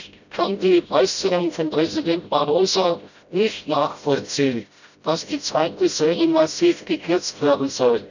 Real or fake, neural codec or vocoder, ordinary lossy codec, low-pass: fake; codec, 16 kHz, 0.5 kbps, FreqCodec, smaller model; none; 7.2 kHz